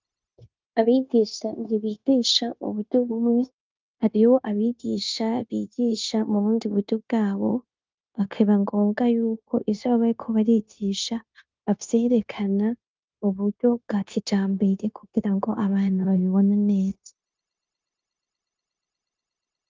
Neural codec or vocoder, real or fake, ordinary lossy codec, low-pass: codec, 16 kHz, 0.9 kbps, LongCat-Audio-Codec; fake; Opus, 24 kbps; 7.2 kHz